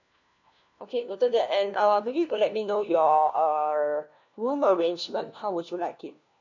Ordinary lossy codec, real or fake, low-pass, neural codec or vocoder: none; fake; 7.2 kHz; codec, 16 kHz, 1 kbps, FunCodec, trained on LibriTTS, 50 frames a second